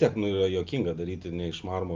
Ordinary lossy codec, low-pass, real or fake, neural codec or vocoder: Opus, 24 kbps; 7.2 kHz; real; none